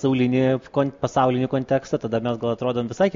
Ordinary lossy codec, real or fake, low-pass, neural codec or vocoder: MP3, 48 kbps; real; 7.2 kHz; none